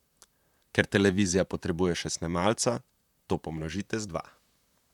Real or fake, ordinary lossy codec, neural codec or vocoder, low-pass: fake; none; vocoder, 44.1 kHz, 128 mel bands, Pupu-Vocoder; 19.8 kHz